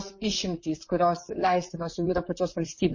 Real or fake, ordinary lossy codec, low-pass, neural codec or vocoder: fake; MP3, 32 kbps; 7.2 kHz; vocoder, 44.1 kHz, 128 mel bands, Pupu-Vocoder